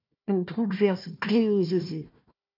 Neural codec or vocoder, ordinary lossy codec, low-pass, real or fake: codec, 16 kHz, 1 kbps, FunCodec, trained on Chinese and English, 50 frames a second; MP3, 48 kbps; 5.4 kHz; fake